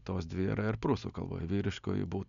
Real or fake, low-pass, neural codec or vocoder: real; 7.2 kHz; none